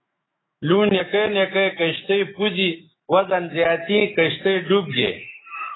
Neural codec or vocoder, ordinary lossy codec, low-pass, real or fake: autoencoder, 48 kHz, 128 numbers a frame, DAC-VAE, trained on Japanese speech; AAC, 16 kbps; 7.2 kHz; fake